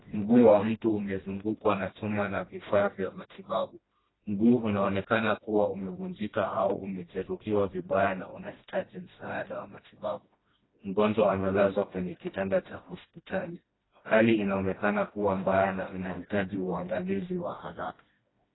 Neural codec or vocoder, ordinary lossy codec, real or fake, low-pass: codec, 16 kHz, 1 kbps, FreqCodec, smaller model; AAC, 16 kbps; fake; 7.2 kHz